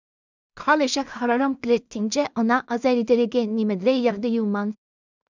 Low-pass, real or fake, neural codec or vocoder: 7.2 kHz; fake; codec, 16 kHz in and 24 kHz out, 0.4 kbps, LongCat-Audio-Codec, two codebook decoder